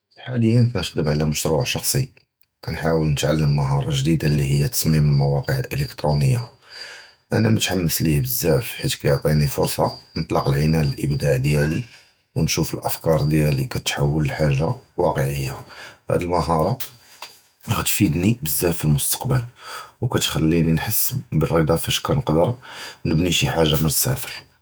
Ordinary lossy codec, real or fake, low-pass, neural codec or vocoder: none; fake; none; autoencoder, 48 kHz, 128 numbers a frame, DAC-VAE, trained on Japanese speech